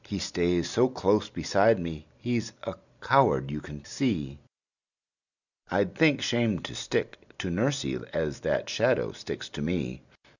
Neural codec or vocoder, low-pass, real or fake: none; 7.2 kHz; real